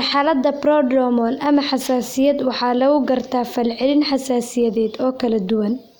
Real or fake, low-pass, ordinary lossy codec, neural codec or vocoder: real; none; none; none